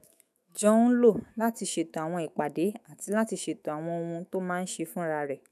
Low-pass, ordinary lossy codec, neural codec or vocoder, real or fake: 14.4 kHz; none; autoencoder, 48 kHz, 128 numbers a frame, DAC-VAE, trained on Japanese speech; fake